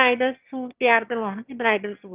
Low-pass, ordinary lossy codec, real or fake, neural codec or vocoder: 3.6 kHz; Opus, 32 kbps; fake; autoencoder, 22.05 kHz, a latent of 192 numbers a frame, VITS, trained on one speaker